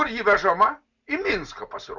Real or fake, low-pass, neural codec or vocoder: real; 7.2 kHz; none